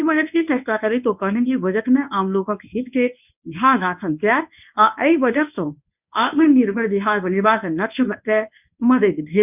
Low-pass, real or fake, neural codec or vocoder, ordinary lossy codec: 3.6 kHz; fake; codec, 24 kHz, 0.9 kbps, WavTokenizer, medium speech release version 1; none